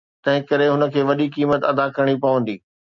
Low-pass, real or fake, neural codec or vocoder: 7.2 kHz; real; none